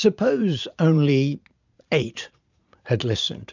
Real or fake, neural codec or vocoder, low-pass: fake; vocoder, 44.1 kHz, 80 mel bands, Vocos; 7.2 kHz